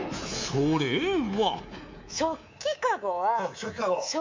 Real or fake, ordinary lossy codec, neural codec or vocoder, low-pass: fake; MP3, 48 kbps; codec, 24 kHz, 3.1 kbps, DualCodec; 7.2 kHz